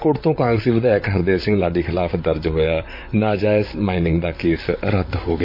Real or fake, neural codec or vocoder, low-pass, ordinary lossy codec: fake; vocoder, 44.1 kHz, 128 mel bands, Pupu-Vocoder; 5.4 kHz; MP3, 32 kbps